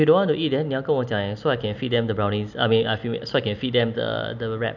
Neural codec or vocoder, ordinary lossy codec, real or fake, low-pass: none; none; real; 7.2 kHz